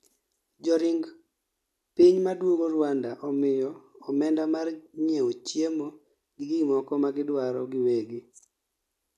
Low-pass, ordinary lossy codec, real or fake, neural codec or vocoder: 14.4 kHz; none; real; none